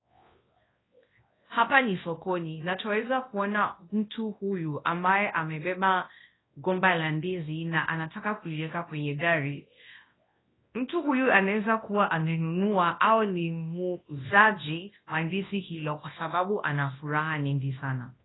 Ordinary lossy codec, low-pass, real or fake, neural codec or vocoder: AAC, 16 kbps; 7.2 kHz; fake; codec, 24 kHz, 0.9 kbps, WavTokenizer, large speech release